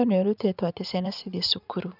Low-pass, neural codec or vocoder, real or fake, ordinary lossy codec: 5.4 kHz; vocoder, 44.1 kHz, 80 mel bands, Vocos; fake; none